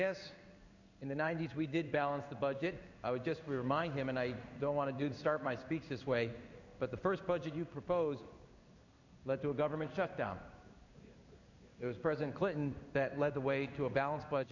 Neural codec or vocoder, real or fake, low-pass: codec, 16 kHz in and 24 kHz out, 1 kbps, XY-Tokenizer; fake; 7.2 kHz